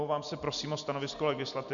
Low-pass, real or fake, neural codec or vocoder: 7.2 kHz; real; none